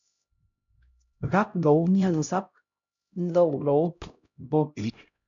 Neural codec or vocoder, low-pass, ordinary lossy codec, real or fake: codec, 16 kHz, 0.5 kbps, X-Codec, HuBERT features, trained on LibriSpeech; 7.2 kHz; MP3, 96 kbps; fake